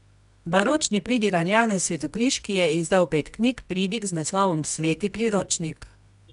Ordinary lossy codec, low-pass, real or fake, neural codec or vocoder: none; 10.8 kHz; fake; codec, 24 kHz, 0.9 kbps, WavTokenizer, medium music audio release